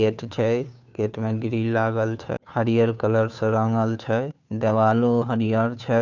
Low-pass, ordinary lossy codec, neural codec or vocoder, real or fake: 7.2 kHz; none; codec, 16 kHz, 2 kbps, FunCodec, trained on Chinese and English, 25 frames a second; fake